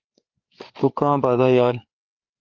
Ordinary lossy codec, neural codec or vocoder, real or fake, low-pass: Opus, 24 kbps; codec, 16 kHz, 4 kbps, FreqCodec, larger model; fake; 7.2 kHz